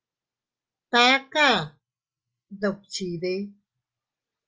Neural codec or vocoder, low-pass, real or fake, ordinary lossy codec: none; 7.2 kHz; real; Opus, 24 kbps